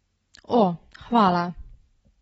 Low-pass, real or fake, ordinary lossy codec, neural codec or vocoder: 10.8 kHz; real; AAC, 24 kbps; none